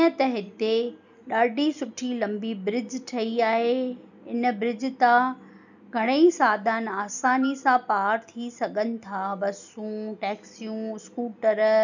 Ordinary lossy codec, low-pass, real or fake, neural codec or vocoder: none; 7.2 kHz; real; none